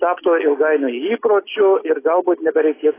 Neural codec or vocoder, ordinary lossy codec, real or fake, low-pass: none; AAC, 16 kbps; real; 3.6 kHz